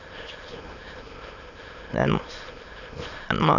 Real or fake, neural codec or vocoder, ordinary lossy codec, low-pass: fake; autoencoder, 22.05 kHz, a latent of 192 numbers a frame, VITS, trained on many speakers; none; 7.2 kHz